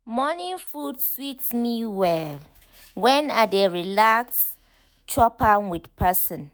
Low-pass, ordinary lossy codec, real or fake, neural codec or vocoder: none; none; fake; autoencoder, 48 kHz, 128 numbers a frame, DAC-VAE, trained on Japanese speech